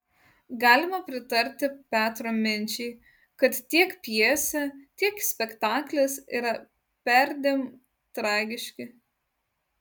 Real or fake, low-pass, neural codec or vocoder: real; 19.8 kHz; none